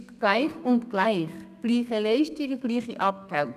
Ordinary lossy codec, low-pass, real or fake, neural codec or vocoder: none; 14.4 kHz; fake; codec, 32 kHz, 1.9 kbps, SNAC